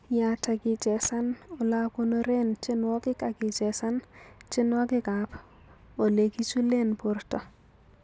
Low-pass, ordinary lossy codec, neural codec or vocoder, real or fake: none; none; none; real